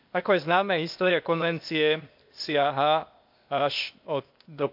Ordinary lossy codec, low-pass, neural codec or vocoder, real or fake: MP3, 48 kbps; 5.4 kHz; codec, 16 kHz, 0.8 kbps, ZipCodec; fake